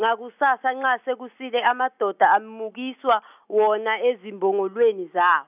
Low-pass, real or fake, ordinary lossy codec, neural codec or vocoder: 3.6 kHz; real; none; none